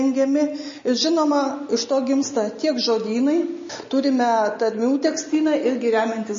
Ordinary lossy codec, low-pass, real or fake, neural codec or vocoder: MP3, 32 kbps; 7.2 kHz; real; none